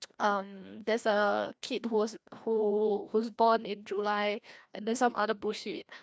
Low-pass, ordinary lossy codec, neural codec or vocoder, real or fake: none; none; codec, 16 kHz, 1 kbps, FreqCodec, larger model; fake